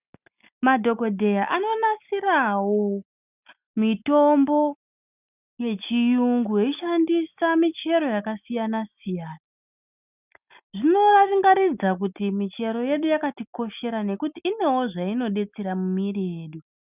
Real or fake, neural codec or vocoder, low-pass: real; none; 3.6 kHz